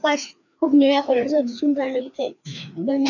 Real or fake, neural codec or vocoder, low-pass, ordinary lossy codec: fake; codec, 16 kHz, 2 kbps, FreqCodec, larger model; 7.2 kHz; none